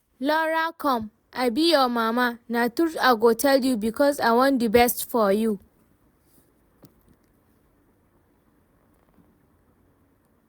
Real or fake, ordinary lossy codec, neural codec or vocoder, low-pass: real; none; none; none